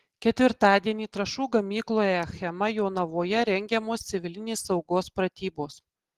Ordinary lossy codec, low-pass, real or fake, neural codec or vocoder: Opus, 16 kbps; 14.4 kHz; real; none